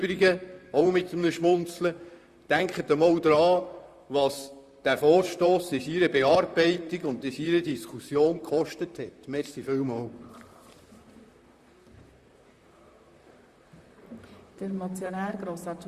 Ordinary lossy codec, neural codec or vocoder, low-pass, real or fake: Opus, 64 kbps; vocoder, 44.1 kHz, 128 mel bands, Pupu-Vocoder; 14.4 kHz; fake